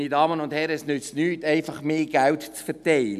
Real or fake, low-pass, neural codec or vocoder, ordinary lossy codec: real; 14.4 kHz; none; none